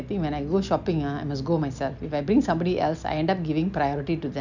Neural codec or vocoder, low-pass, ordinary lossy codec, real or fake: none; 7.2 kHz; none; real